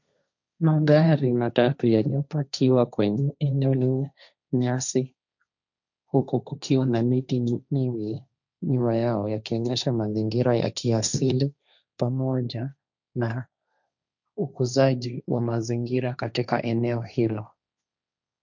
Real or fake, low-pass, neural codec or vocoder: fake; 7.2 kHz; codec, 16 kHz, 1.1 kbps, Voila-Tokenizer